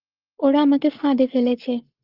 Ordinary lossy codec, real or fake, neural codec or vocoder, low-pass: Opus, 24 kbps; fake; codec, 44.1 kHz, 7.8 kbps, Pupu-Codec; 5.4 kHz